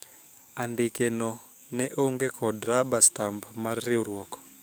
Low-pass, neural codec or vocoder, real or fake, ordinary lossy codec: none; codec, 44.1 kHz, 7.8 kbps, DAC; fake; none